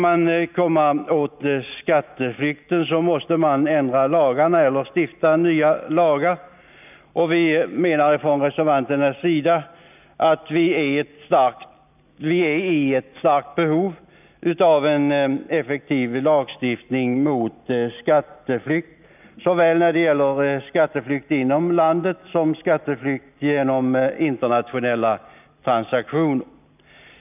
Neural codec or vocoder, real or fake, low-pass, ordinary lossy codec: none; real; 3.6 kHz; none